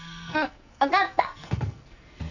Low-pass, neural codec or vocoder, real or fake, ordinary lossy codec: 7.2 kHz; codec, 44.1 kHz, 2.6 kbps, SNAC; fake; none